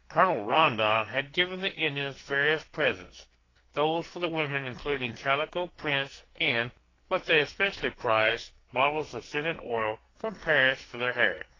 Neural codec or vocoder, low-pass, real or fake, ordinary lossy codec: codec, 44.1 kHz, 2.6 kbps, SNAC; 7.2 kHz; fake; AAC, 32 kbps